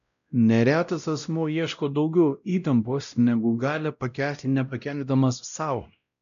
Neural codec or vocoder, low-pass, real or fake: codec, 16 kHz, 0.5 kbps, X-Codec, WavLM features, trained on Multilingual LibriSpeech; 7.2 kHz; fake